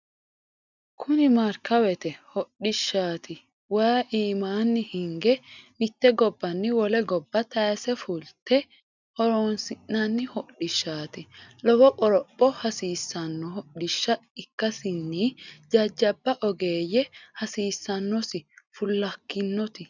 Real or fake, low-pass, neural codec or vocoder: real; 7.2 kHz; none